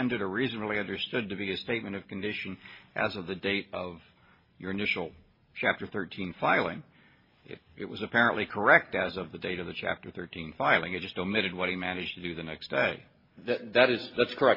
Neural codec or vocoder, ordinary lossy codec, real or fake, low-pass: none; MP3, 24 kbps; real; 5.4 kHz